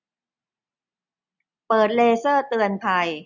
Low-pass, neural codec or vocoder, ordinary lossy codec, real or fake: 7.2 kHz; none; none; real